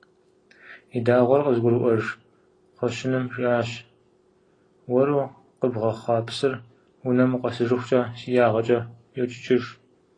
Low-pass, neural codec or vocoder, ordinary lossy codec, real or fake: 9.9 kHz; none; AAC, 32 kbps; real